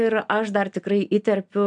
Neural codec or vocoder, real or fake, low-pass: none; real; 9.9 kHz